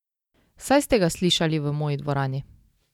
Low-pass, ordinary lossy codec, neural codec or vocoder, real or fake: 19.8 kHz; none; none; real